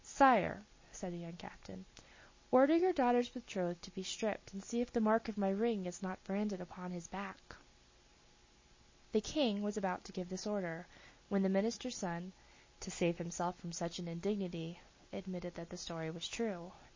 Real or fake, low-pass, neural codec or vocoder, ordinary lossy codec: real; 7.2 kHz; none; MP3, 32 kbps